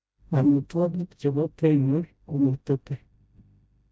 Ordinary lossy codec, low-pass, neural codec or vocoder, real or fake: none; none; codec, 16 kHz, 0.5 kbps, FreqCodec, smaller model; fake